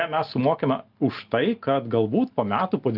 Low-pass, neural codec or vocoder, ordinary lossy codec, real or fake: 5.4 kHz; none; Opus, 24 kbps; real